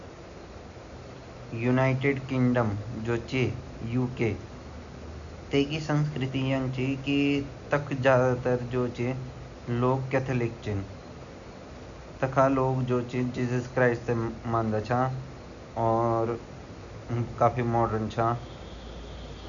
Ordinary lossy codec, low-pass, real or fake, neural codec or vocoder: none; 7.2 kHz; real; none